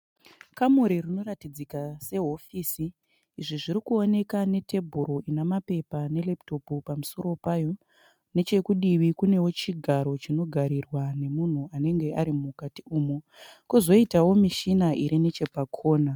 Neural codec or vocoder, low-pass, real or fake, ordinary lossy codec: none; 19.8 kHz; real; MP3, 96 kbps